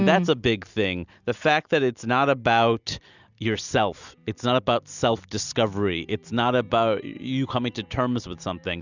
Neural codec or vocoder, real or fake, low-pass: none; real; 7.2 kHz